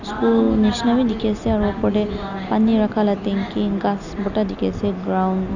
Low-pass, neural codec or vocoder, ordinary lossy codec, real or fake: 7.2 kHz; none; none; real